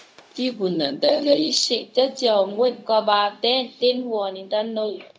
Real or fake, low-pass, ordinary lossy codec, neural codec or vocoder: fake; none; none; codec, 16 kHz, 0.4 kbps, LongCat-Audio-Codec